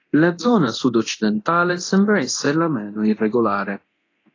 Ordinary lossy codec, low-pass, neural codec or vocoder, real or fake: AAC, 32 kbps; 7.2 kHz; codec, 24 kHz, 0.9 kbps, DualCodec; fake